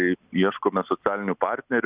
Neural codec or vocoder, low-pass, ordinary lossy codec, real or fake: none; 3.6 kHz; Opus, 64 kbps; real